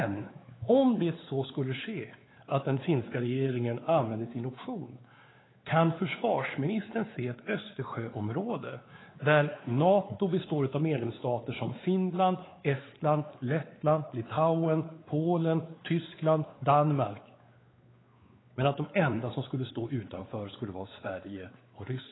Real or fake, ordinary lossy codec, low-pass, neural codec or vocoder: fake; AAC, 16 kbps; 7.2 kHz; codec, 16 kHz, 4 kbps, X-Codec, WavLM features, trained on Multilingual LibriSpeech